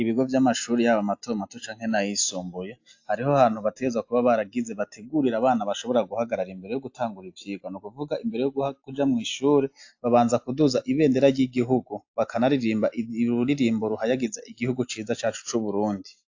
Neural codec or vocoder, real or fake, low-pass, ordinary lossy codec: none; real; 7.2 kHz; AAC, 48 kbps